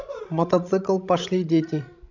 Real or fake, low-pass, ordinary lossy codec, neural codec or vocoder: fake; 7.2 kHz; none; codec, 16 kHz, 16 kbps, FreqCodec, larger model